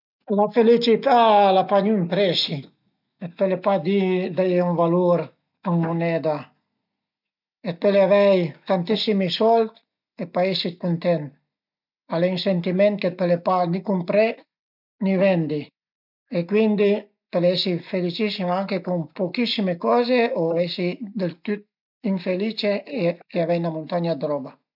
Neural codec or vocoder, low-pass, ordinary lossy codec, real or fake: none; 5.4 kHz; none; real